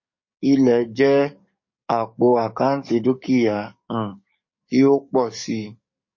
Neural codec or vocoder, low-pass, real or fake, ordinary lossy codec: codec, 44.1 kHz, 7.8 kbps, DAC; 7.2 kHz; fake; MP3, 32 kbps